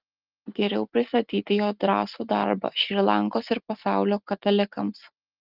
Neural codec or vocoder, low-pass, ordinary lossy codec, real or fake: none; 5.4 kHz; Opus, 24 kbps; real